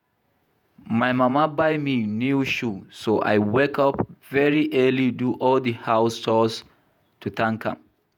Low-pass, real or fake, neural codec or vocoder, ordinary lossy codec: 19.8 kHz; fake; vocoder, 48 kHz, 128 mel bands, Vocos; none